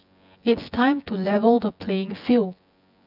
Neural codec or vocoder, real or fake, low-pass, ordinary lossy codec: vocoder, 24 kHz, 100 mel bands, Vocos; fake; 5.4 kHz; none